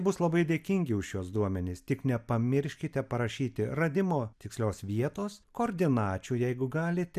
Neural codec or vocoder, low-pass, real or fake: none; 14.4 kHz; real